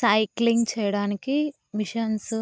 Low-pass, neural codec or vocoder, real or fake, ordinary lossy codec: none; none; real; none